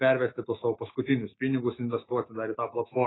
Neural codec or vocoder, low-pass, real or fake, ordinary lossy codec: autoencoder, 48 kHz, 128 numbers a frame, DAC-VAE, trained on Japanese speech; 7.2 kHz; fake; AAC, 16 kbps